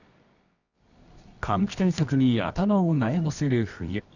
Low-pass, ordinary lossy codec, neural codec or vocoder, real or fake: 7.2 kHz; none; codec, 24 kHz, 0.9 kbps, WavTokenizer, medium music audio release; fake